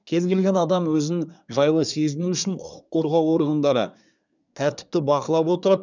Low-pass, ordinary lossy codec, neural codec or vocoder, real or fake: 7.2 kHz; none; codec, 24 kHz, 1 kbps, SNAC; fake